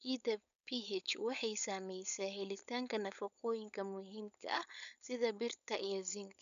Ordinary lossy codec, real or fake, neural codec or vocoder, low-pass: none; fake; codec, 16 kHz, 4.8 kbps, FACodec; 7.2 kHz